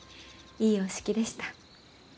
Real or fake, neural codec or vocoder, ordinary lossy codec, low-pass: real; none; none; none